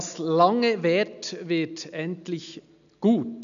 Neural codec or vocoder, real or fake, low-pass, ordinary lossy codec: none; real; 7.2 kHz; none